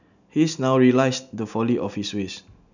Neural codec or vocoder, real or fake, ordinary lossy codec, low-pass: none; real; none; 7.2 kHz